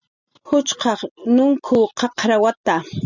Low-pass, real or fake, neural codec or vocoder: 7.2 kHz; real; none